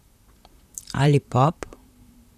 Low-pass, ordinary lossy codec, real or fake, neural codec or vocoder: 14.4 kHz; none; fake; vocoder, 44.1 kHz, 128 mel bands, Pupu-Vocoder